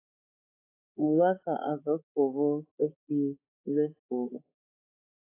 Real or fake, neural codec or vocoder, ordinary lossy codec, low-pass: fake; codec, 16 kHz, 4 kbps, X-Codec, HuBERT features, trained on balanced general audio; AAC, 24 kbps; 3.6 kHz